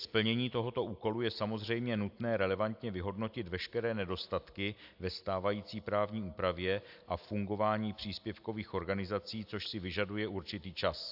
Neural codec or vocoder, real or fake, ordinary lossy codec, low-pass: none; real; AAC, 48 kbps; 5.4 kHz